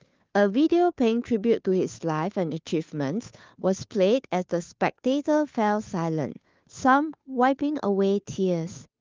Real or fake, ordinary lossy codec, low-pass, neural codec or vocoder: fake; Opus, 24 kbps; 7.2 kHz; codec, 16 kHz, 2 kbps, FunCodec, trained on Chinese and English, 25 frames a second